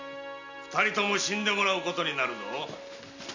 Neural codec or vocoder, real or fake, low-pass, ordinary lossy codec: none; real; 7.2 kHz; none